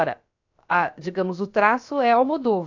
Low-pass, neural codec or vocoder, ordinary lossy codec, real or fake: 7.2 kHz; codec, 16 kHz, about 1 kbps, DyCAST, with the encoder's durations; AAC, 48 kbps; fake